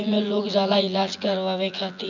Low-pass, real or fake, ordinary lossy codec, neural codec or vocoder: 7.2 kHz; fake; none; vocoder, 24 kHz, 100 mel bands, Vocos